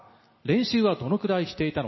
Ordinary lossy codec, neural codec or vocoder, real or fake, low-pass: MP3, 24 kbps; none; real; 7.2 kHz